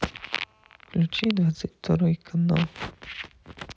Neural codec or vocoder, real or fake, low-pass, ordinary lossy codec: none; real; none; none